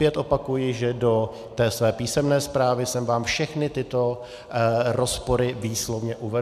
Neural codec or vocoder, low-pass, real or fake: none; 14.4 kHz; real